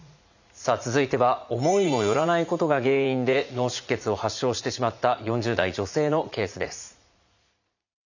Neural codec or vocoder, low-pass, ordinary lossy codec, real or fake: vocoder, 44.1 kHz, 80 mel bands, Vocos; 7.2 kHz; none; fake